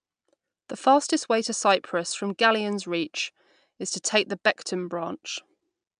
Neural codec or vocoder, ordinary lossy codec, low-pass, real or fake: none; MP3, 96 kbps; 9.9 kHz; real